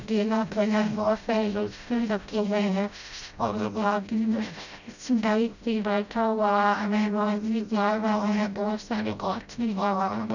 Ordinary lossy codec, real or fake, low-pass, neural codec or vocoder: none; fake; 7.2 kHz; codec, 16 kHz, 0.5 kbps, FreqCodec, smaller model